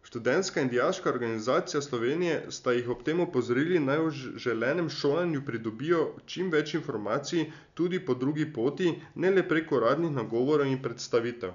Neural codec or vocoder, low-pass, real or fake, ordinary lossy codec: none; 7.2 kHz; real; none